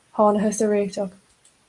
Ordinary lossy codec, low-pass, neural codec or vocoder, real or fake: Opus, 32 kbps; 10.8 kHz; none; real